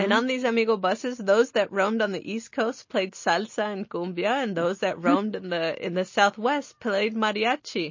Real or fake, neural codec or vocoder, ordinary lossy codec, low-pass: real; none; MP3, 32 kbps; 7.2 kHz